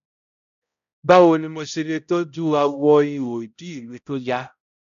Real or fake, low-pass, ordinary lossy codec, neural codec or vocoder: fake; 7.2 kHz; none; codec, 16 kHz, 0.5 kbps, X-Codec, HuBERT features, trained on balanced general audio